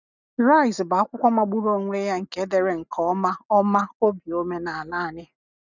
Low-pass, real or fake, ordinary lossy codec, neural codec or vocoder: 7.2 kHz; real; none; none